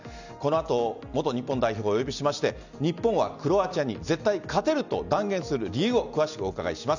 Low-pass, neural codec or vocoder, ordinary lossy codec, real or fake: 7.2 kHz; none; none; real